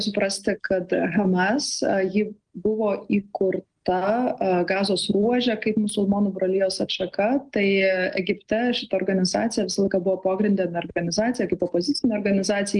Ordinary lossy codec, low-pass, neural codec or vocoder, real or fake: Opus, 24 kbps; 9.9 kHz; none; real